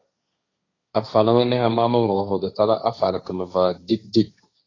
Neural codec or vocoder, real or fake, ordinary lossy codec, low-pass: codec, 16 kHz, 1.1 kbps, Voila-Tokenizer; fake; AAC, 32 kbps; 7.2 kHz